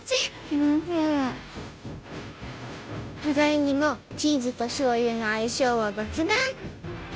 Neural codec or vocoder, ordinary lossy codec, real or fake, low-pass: codec, 16 kHz, 0.5 kbps, FunCodec, trained on Chinese and English, 25 frames a second; none; fake; none